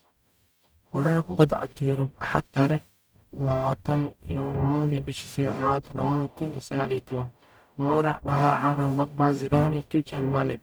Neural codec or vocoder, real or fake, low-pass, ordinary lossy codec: codec, 44.1 kHz, 0.9 kbps, DAC; fake; none; none